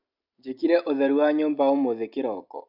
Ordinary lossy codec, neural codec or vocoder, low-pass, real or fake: AAC, 48 kbps; none; 5.4 kHz; real